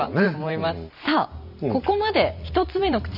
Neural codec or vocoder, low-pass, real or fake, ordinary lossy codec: vocoder, 44.1 kHz, 128 mel bands every 512 samples, BigVGAN v2; 5.4 kHz; fake; none